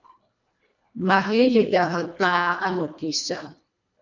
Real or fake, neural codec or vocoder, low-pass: fake; codec, 24 kHz, 1.5 kbps, HILCodec; 7.2 kHz